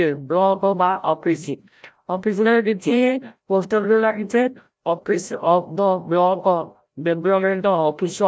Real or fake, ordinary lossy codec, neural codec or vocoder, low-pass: fake; none; codec, 16 kHz, 0.5 kbps, FreqCodec, larger model; none